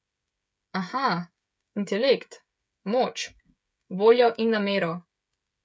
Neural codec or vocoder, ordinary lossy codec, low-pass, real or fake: codec, 16 kHz, 16 kbps, FreqCodec, smaller model; none; none; fake